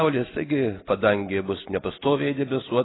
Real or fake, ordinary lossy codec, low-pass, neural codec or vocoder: real; AAC, 16 kbps; 7.2 kHz; none